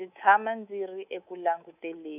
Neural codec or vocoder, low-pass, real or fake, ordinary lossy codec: codec, 24 kHz, 3.1 kbps, DualCodec; 3.6 kHz; fake; none